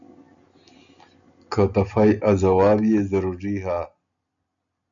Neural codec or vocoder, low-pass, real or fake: none; 7.2 kHz; real